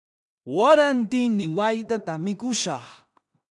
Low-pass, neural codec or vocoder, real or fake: 10.8 kHz; codec, 16 kHz in and 24 kHz out, 0.4 kbps, LongCat-Audio-Codec, two codebook decoder; fake